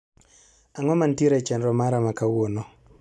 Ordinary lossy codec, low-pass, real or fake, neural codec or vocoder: none; none; real; none